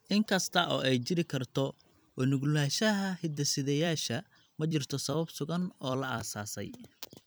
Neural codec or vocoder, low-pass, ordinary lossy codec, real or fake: vocoder, 44.1 kHz, 128 mel bands every 256 samples, BigVGAN v2; none; none; fake